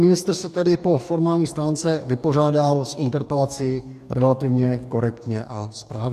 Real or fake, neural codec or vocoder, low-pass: fake; codec, 44.1 kHz, 2.6 kbps, DAC; 14.4 kHz